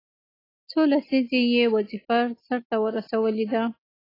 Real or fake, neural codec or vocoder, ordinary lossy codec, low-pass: real; none; AAC, 24 kbps; 5.4 kHz